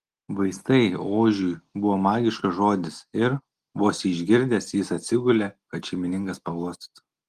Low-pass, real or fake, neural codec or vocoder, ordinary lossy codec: 14.4 kHz; real; none; Opus, 24 kbps